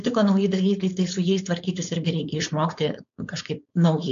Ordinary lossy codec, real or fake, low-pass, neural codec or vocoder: AAC, 64 kbps; fake; 7.2 kHz; codec, 16 kHz, 4.8 kbps, FACodec